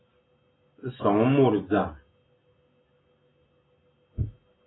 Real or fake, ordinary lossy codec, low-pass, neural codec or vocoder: real; AAC, 16 kbps; 7.2 kHz; none